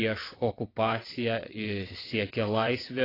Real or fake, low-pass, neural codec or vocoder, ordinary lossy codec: fake; 5.4 kHz; vocoder, 22.05 kHz, 80 mel bands, WaveNeXt; AAC, 24 kbps